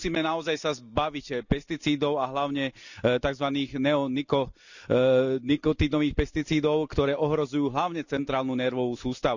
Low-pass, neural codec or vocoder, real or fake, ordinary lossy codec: 7.2 kHz; none; real; none